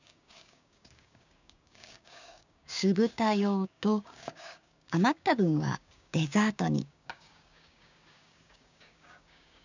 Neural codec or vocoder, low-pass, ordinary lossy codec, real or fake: codec, 16 kHz, 6 kbps, DAC; 7.2 kHz; none; fake